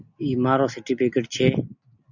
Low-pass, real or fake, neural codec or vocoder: 7.2 kHz; real; none